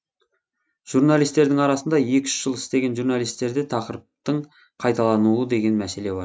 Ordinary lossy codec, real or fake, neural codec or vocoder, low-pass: none; real; none; none